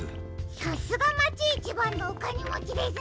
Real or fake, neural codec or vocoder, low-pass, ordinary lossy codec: real; none; none; none